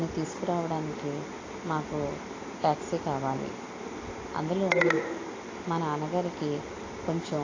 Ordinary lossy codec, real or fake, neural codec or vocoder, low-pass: AAC, 32 kbps; real; none; 7.2 kHz